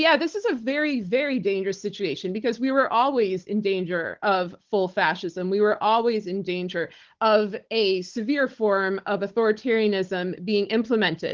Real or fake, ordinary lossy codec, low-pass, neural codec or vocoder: fake; Opus, 16 kbps; 7.2 kHz; codec, 16 kHz, 16 kbps, FunCodec, trained on Chinese and English, 50 frames a second